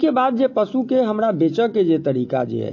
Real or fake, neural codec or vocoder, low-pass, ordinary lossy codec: real; none; 7.2 kHz; AAC, 48 kbps